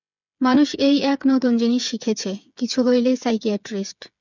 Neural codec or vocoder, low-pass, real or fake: codec, 16 kHz, 8 kbps, FreqCodec, smaller model; 7.2 kHz; fake